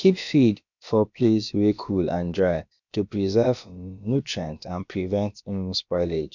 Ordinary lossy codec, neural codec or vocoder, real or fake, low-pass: none; codec, 16 kHz, about 1 kbps, DyCAST, with the encoder's durations; fake; 7.2 kHz